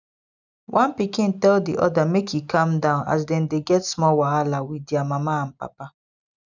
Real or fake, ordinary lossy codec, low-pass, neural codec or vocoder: real; none; 7.2 kHz; none